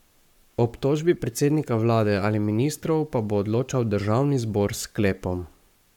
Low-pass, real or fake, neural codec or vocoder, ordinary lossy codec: 19.8 kHz; fake; codec, 44.1 kHz, 7.8 kbps, Pupu-Codec; none